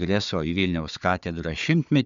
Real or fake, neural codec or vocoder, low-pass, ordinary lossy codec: fake; codec, 16 kHz, 4 kbps, FunCodec, trained on Chinese and English, 50 frames a second; 7.2 kHz; MP3, 64 kbps